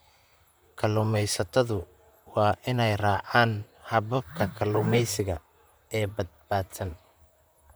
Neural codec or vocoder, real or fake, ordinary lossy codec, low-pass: vocoder, 44.1 kHz, 128 mel bands, Pupu-Vocoder; fake; none; none